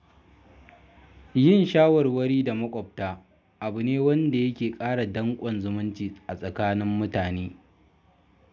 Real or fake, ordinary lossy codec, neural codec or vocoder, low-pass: real; none; none; none